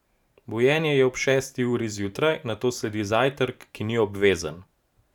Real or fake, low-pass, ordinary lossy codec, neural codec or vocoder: fake; 19.8 kHz; none; vocoder, 44.1 kHz, 128 mel bands every 512 samples, BigVGAN v2